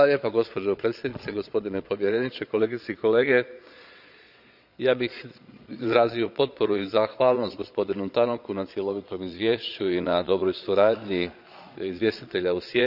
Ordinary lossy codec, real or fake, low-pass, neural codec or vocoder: none; fake; 5.4 kHz; vocoder, 22.05 kHz, 80 mel bands, Vocos